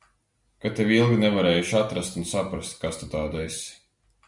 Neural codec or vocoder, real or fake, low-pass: none; real; 10.8 kHz